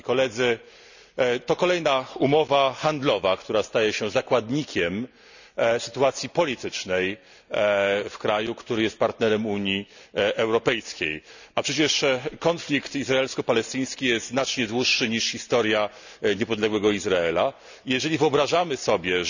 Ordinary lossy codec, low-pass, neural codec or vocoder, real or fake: none; 7.2 kHz; none; real